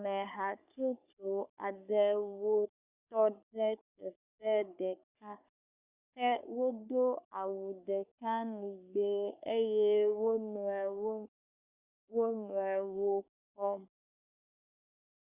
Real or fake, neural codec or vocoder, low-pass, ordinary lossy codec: fake; codec, 44.1 kHz, 3.4 kbps, Pupu-Codec; 3.6 kHz; Opus, 64 kbps